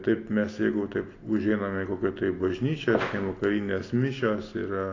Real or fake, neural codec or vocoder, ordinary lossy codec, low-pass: real; none; AAC, 32 kbps; 7.2 kHz